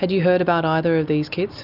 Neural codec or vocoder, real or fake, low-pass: none; real; 5.4 kHz